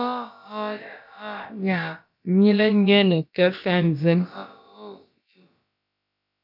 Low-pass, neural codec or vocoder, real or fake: 5.4 kHz; codec, 16 kHz, about 1 kbps, DyCAST, with the encoder's durations; fake